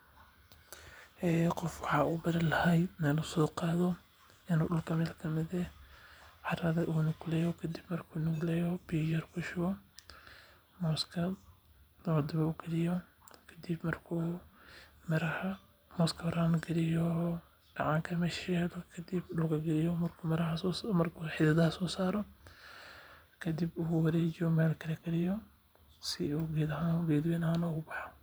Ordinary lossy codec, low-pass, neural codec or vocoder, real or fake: none; none; vocoder, 44.1 kHz, 128 mel bands every 512 samples, BigVGAN v2; fake